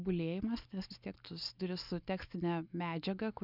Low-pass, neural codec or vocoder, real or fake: 5.4 kHz; none; real